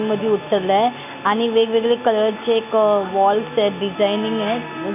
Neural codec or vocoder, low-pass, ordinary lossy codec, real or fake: none; 3.6 kHz; none; real